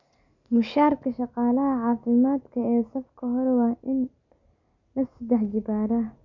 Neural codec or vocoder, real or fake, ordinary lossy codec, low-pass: none; real; none; 7.2 kHz